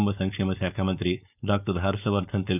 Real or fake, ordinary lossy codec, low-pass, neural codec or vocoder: fake; none; 3.6 kHz; codec, 16 kHz, 4.8 kbps, FACodec